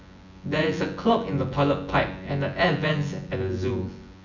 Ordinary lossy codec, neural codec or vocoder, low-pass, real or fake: none; vocoder, 24 kHz, 100 mel bands, Vocos; 7.2 kHz; fake